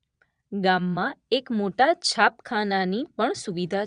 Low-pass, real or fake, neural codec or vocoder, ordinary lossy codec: 9.9 kHz; fake; vocoder, 22.05 kHz, 80 mel bands, Vocos; none